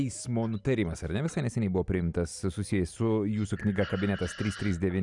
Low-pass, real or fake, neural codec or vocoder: 10.8 kHz; real; none